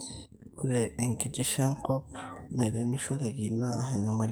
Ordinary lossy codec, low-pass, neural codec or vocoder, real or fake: none; none; codec, 44.1 kHz, 2.6 kbps, SNAC; fake